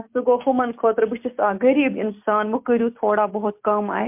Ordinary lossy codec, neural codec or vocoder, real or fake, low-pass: MP3, 32 kbps; none; real; 3.6 kHz